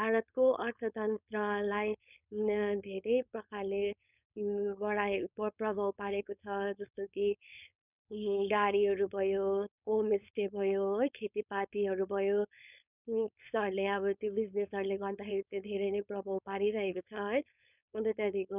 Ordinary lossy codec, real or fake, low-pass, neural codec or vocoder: none; fake; 3.6 kHz; codec, 16 kHz, 4.8 kbps, FACodec